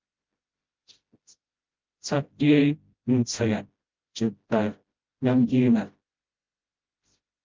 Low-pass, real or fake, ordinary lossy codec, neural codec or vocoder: 7.2 kHz; fake; Opus, 32 kbps; codec, 16 kHz, 0.5 kbps, FreqCodec, smaller model